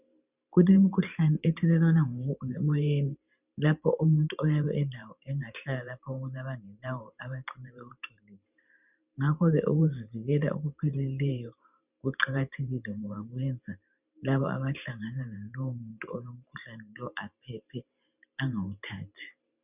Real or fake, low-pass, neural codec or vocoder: fake; 3.6 kHz; vocoder, 44.1 kHz, 128 mel bands every 512 samples, BigVGAN v2